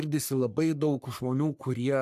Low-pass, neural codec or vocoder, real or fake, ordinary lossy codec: 14.4 kHz; codec, 44.1 kHz, 3.4 kbps, Pupu-Codec; fake; AAC, 96 kbps